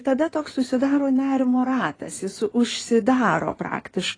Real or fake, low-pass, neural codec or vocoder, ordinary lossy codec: fake; 9.9 kHz; codec, 16 kHz in and 24 kHz out, 2.2 kbps, FireRedTTS-2 codec; AAC, 32 kbps